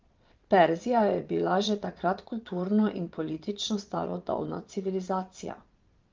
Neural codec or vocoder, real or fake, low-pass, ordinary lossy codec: vocoder, 22.05 kHz, 80 mel bands, Vocos; fake; 7.2 kHz; Opus, 24 kbps